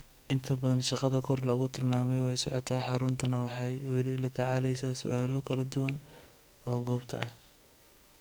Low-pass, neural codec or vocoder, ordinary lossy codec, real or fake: none; codec, 44.1 kHz, 2.6 kbps, SNAC; none; fake